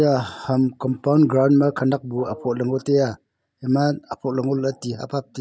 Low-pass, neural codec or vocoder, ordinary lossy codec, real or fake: none; none; none; real